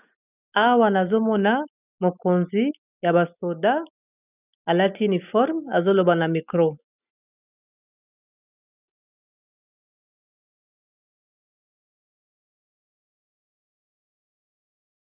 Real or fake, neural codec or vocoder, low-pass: real; none; 3.6 kHz